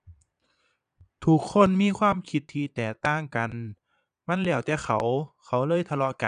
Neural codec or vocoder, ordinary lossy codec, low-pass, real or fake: vocoder, 22.05 kHz, 80 mel bands, Vocos; none; 9.9 kHz; fake